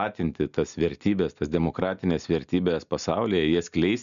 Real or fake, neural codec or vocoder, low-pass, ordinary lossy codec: real; none; 7.2 kHz; MP3, 96 kbps